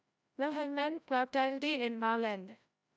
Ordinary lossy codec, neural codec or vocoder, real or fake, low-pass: none; codec, 16 kHz, 0.5 kbps, FreqCodec, larger model; fake; none